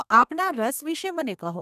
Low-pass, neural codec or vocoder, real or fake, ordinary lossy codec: 14.4 kHz; codec, 32 kHz, 1.9 kbps, SNAC; fake; none